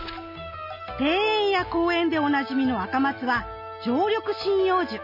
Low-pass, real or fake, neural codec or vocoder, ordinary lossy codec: 5.4 kHz; real; none; none